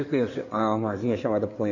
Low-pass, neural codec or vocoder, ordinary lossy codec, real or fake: 7.2 kHz; codec, 16 kHz in and 24 kHz out, 2.2 kbps, FireRedTTS-2 codec; none; fake